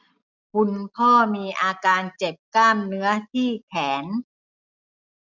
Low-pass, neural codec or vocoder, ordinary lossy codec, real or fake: 7.2 kHz; none; none; real